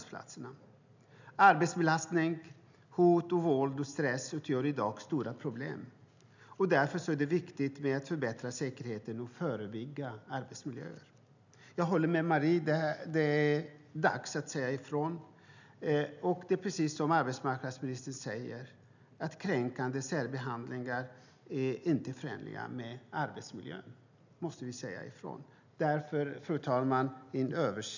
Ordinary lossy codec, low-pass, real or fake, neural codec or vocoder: none; 7.2 kHz; real; none